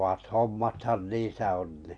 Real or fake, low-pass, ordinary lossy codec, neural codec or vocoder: real; 9.9 kHz; none; none